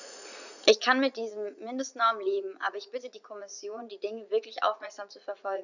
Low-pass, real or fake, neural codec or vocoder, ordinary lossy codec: 7.2 kHz; fake; vocoder, 44.1 kHz, 80 mel bands, Vocos; none